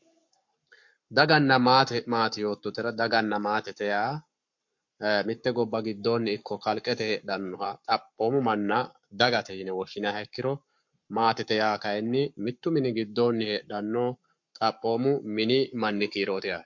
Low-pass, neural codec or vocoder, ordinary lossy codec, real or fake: 7.2 kHz; codec, 44.1 kHz, 7.8 kbps, Pupu-Codec; MP3, 48 kbps; fake